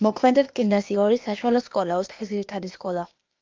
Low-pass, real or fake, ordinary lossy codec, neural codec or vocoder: 7.2 kHz; fake; Opus, 24 kbps; codec, 16 kHz, 0.8 kbps, ZipCodec